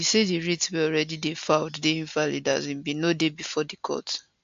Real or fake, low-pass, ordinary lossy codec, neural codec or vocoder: real; 7.2 kHz; AAC, 64 kbps; none